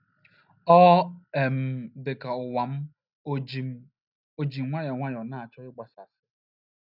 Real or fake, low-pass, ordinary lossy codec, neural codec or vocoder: real; 5.4 kHz; none; none